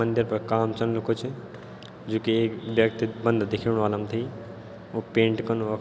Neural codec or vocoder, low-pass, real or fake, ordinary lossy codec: none; none; real; none